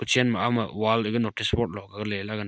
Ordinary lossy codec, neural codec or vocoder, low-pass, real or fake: none; none; none; real